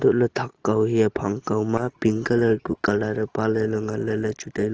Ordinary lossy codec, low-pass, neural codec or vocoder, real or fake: Opus, 16 kbps; 7.2 kHz; none; real